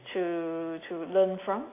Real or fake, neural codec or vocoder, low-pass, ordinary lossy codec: real; none; 3.6 kHz; AAC, 24 kbps